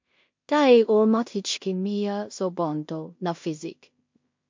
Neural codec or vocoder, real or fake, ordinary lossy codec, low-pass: codec, 16 kHz in and 24 kHz out, 0.4 kbps, LongCat-Audio-Codec, two codebook decoder; fake; MP3, 48 kbps; 7.2 kHz